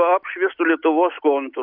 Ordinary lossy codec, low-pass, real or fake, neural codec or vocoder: AAC, 48 kbps; 5.4 kHz; real; none